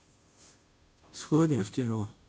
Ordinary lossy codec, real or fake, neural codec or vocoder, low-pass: none; fake; codec, 16 kHz, 0.5 kbps, FunCodec, trained on Chinese and English, 25 frames a second; none